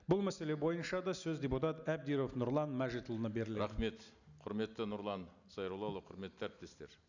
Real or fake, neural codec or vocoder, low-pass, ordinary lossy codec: real; none; 7.2 kHz; none